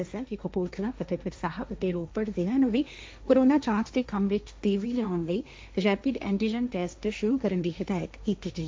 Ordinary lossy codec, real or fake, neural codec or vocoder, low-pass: none; fake; codec, 16 kHz, 1.1 kbps, Voila-Tokenizer; none